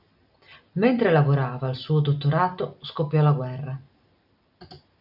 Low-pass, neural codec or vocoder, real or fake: 5.4 kHz; none; real